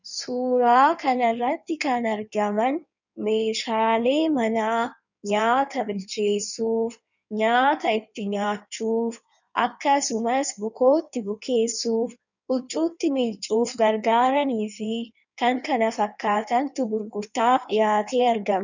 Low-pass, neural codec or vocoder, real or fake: 7.2 kHz; codec, 16 kHz in and 24 kHz out, 1.1 kbps, FireRedTTS-2 codec; fake